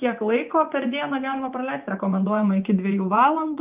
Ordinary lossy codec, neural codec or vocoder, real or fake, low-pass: Opus, 32 kbps; vocoder, 24 kHz, 100 mel bands, Vocos; fake; 3.6 kHz